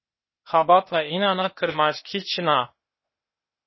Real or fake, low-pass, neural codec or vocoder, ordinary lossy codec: fake; 7.2 kHz; codec, 16 kHz, 0.8 kbps, ZipCodec; MP3, 24 kbps